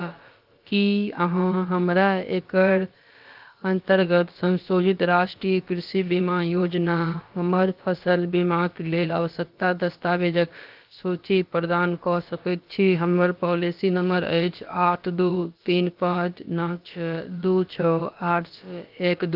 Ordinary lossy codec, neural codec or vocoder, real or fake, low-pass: Opus, 32 kbps; codec, 16 kHz, about 1 kbps, DyCAST, with the encoder's durations; fake; 5.4 kHz